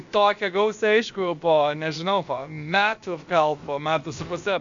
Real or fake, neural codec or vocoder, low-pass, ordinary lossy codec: fake; codec, 16 kHz, about 1 kbps, DyCAST, with the encoder's durations; 7.2 kHz; MP3, 64 kbps